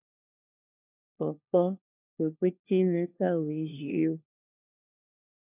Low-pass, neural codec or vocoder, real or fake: 3.6 kHz; codec, 16 kHz, 1 kbps, FunCodec, trained on LibriTTS, 50 frames a second; fake